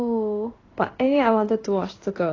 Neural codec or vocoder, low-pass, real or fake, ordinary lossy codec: codec, 16 kHz in and 24 kHz out, 1 kbps, XY-Tokenizer; 7.2 kHz; fake; AAC, 32 kbps